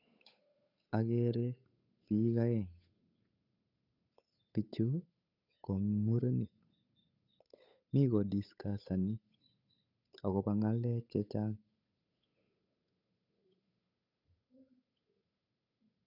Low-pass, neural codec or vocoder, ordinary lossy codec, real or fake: 5.4 kHz; codec, 16 kHz, 8 kbps, FunCodec, trained on Chinese and English, 25 frames a second; none; fake